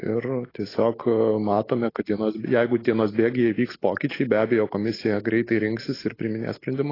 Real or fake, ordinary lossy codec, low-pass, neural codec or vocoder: real; AAC, 24 kbps; 5.4 kHz; none